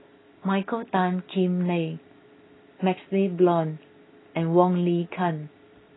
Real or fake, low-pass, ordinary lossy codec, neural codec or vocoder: real; 7.2 kHz; AAC, 16 kbps; none